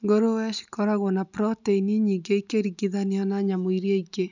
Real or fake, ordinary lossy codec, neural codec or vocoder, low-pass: real; none; none; 7.2 kHz